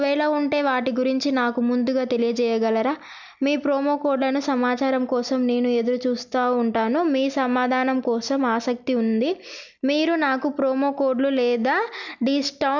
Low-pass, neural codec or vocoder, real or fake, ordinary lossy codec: 7.2 kHz; none; real; none